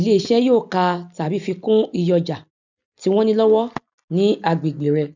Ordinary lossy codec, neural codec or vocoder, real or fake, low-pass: none; none; real; 7.2 kHz